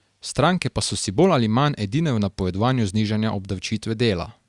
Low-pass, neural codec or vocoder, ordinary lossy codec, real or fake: 10.8 kHz; none; Opus, 64 kbps; real